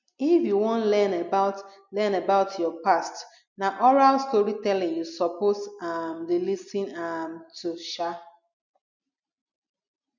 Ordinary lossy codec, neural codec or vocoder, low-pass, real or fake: none; none; 7.2 kHz; real